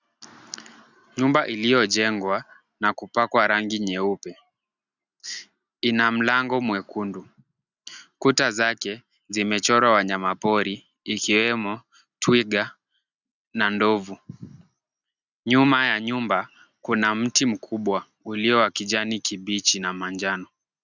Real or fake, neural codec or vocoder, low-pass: real; none; 7.2 kHz